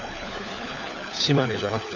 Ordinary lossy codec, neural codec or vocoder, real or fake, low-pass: none; codec, 16 kHz, 4 kbps, FunCodec, trained on LibriTTS, 50 frames a second; fake; 7.2 kHz